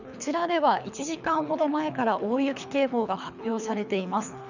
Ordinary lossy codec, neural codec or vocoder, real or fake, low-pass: none; codec, 24 kHz, 3 kbps, HILCodec; fake; 7.2 kHz